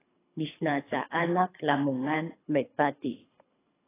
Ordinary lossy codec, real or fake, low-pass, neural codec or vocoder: AAC, 16 kbps; fake; 3.6 kHz; codec, 24 kHz, 3 kbps, HILCodec